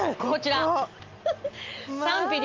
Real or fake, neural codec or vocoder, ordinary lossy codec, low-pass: real; none; Opus, 24 kbps; 7.2 kHz